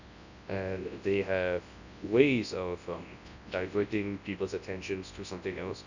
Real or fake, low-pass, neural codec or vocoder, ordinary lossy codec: fake; 7.2 kHz; codec, 24 kHz, 0.9 kbps, WavTokenizer, large speech release; none